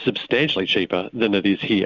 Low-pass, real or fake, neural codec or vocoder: 7.2 kHz; real; none